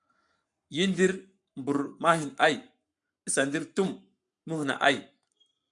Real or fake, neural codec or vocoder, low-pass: fake; codec, 44.1 kHz, 7.8 kbps, Pupu-Codec; 10.8 kHz